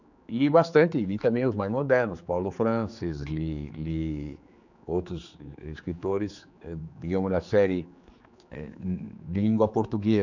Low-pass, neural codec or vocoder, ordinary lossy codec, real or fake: 7.2 kHz; codec, 16 kHz, 4 kbps, X-Codec, HuBERT features, trained on general audio; none; fake